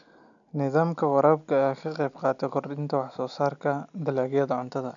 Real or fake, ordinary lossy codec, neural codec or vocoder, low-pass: real; none; none; 7.2 kHz